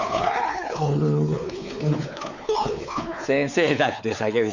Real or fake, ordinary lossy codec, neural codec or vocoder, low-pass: fake; none; codec, 16 kHz, 4 kbps, X-Codec, WavLM features, trained on Multilingual LibriSpeech; 7.2 kHz